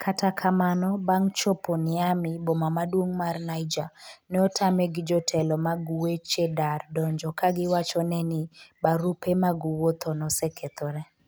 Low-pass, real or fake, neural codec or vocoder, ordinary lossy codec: none; real; none; none